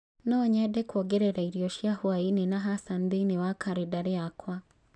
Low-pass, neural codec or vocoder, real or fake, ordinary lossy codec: 9.9 kHz; none; real; none